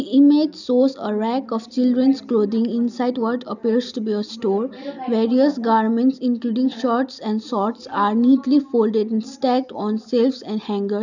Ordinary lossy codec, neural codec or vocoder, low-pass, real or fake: none; none; 7.2 kHz; real